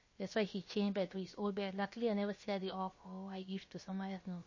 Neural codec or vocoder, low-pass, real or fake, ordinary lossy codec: codec, 16 kHz, about 1 kbps, DyCAST, with the encoder's durations; 7.2 kHz; fake; MP3, 32 kbps